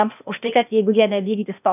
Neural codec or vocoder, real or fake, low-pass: codec, 16 kHz, 0.8 kbps, ZipCodec; fake; 3.6 kHz